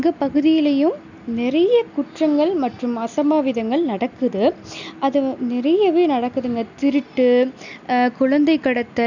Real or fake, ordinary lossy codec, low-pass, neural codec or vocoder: real; none; 7.2 kHz; none